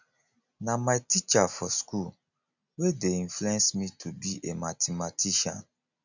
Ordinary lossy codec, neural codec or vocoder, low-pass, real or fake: none; none; 7.2 kHz; real